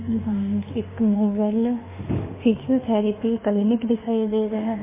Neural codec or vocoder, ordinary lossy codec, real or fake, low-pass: autoencoder, 48 kHz, 32 numbers a frame, DAC-VAE, trained on Japanese speech; MP3, 16 kbps; fake; 3.6 kHz